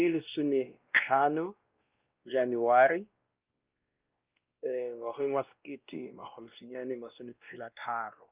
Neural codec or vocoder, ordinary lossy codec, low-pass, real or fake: codec, 16 kHz, 1 kbps, X-Codec, WavLM features, trained on Multilingual LibriSpeech; Opus, 24 kbps; 3.6 kHz; fake